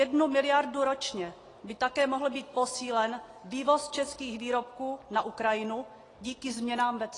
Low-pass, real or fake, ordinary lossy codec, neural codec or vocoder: 10.8 kHz; real; AAC, 32 kbps; none